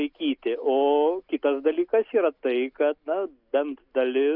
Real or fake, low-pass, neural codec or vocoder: real; 5.4 kHz; none